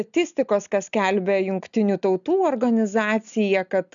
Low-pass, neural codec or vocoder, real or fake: 7.2 kHz; none; real